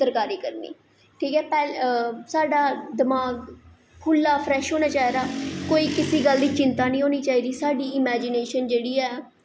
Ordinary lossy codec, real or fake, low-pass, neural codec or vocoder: none; real; none; none